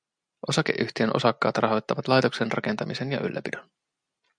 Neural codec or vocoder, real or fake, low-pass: none; real; 9.9 kHz